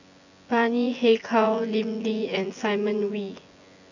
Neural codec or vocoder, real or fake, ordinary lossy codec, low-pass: vocoder, 24 kHz, 100 mel bands, Vocos; fake; none; 7.2 kHz